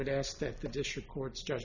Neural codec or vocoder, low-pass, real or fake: none; 7.2 kHz; real